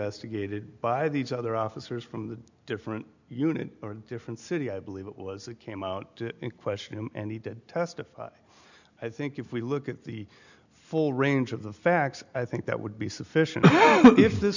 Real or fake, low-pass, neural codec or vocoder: real; 7.2 kHz; none